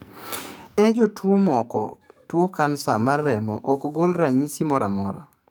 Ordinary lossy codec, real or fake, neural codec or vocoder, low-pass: none; fake; codec, 44.1 kHz, 2.6 kbps, SNAC; none